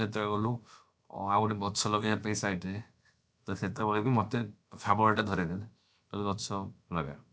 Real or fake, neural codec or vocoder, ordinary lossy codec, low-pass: fake; codec, 16 kHz, about 1 kbps, DyCAST, with the encoder's durations; none; none